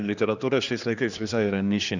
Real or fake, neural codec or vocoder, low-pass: fake; codec, 16 kHz, 2 kbps, X-Codec, HuBERT features, trained on general audio; 7.2 kHz